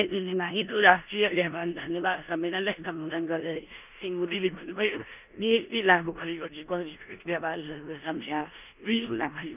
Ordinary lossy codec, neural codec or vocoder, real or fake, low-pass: none; codec, 16 kHz in and 24 kHz out, 0.9 kbps, LongCat-Audio-Codec, four codebook decoder; fake; 3.6 kHz